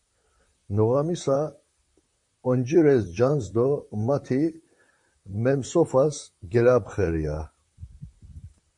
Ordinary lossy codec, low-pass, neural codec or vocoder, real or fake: MP3, 48 kbps; 10.8 kHz; vocoder, 44.1 kHz, 128 mel bands, Pupu-Vocoder; fake